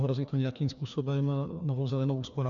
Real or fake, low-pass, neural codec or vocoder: fake; 7.2 kHz; codec, 16 kHz, 2 kbps, FreqCodec, larger model